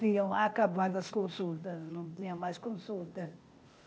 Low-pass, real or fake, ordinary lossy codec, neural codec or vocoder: none; fake; none; codec, 16 kHz, 0.8 kbps, ZipCodec